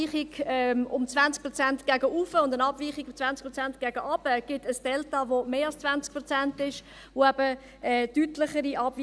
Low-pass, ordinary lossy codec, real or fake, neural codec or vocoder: none; none; real; none